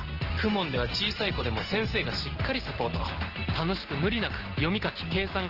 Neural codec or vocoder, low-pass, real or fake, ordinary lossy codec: none; 5.4 kHz; real; Opus, 16 kbps